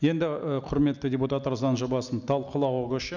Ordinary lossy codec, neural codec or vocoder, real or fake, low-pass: none; none; real; 7.2 kHz